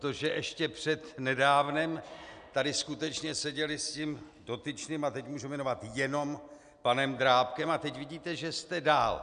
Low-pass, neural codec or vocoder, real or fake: 9.9 kHz; none; real